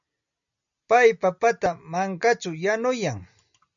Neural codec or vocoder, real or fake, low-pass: none; real; 7.2 kHz